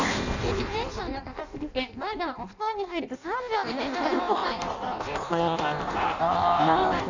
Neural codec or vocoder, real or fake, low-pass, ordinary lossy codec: codec, 16 kHz in and 24 kHz out, 0.6 kbps, FireRedTTS-2 codec; fake; 7.2 kHz; none